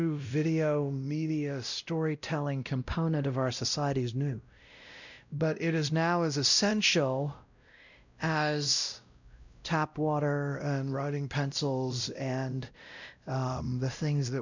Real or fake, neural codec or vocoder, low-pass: fake; codec, 16 kHz, 0.5 kbps, X-Codec, WavLM features, trained on Multilingual LibriSpeech; 7.2 kHz